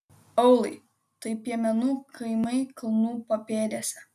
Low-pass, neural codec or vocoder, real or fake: 14.4 kHz; none; real